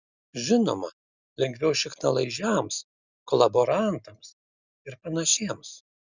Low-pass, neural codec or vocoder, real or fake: 7.2 kHz; none; real